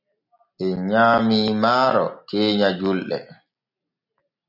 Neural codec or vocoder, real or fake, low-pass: none; real; 5.4 kHz